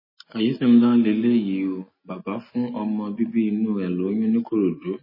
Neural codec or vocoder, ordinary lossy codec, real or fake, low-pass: none; MP3, 24 kbps; real; 5.4 kHz